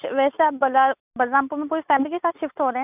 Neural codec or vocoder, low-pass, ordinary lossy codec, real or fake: none; 3.6 kHz; none; real